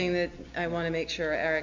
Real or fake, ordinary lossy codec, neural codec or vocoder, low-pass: real; MP3, 48 kbps; none; 7.2 kHz